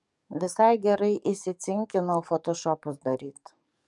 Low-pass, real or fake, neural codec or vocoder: 10.8 kHz; fake; codec, 44.1 kHz, 7.8 kbps, DAC